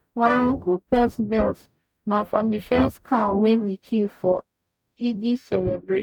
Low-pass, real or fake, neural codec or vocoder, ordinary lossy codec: 19.8 kHz; fake; codec, 44.1 kHz, 0.9 kbps, DAC; none